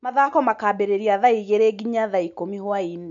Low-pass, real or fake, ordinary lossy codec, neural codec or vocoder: 7.2 kHz; real; none; none